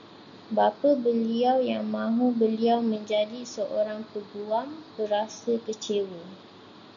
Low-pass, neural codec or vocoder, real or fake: 7.2 kHz; none; real